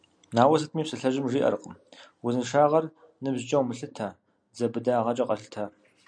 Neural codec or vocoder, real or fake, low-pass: none; real; 9.9 kHz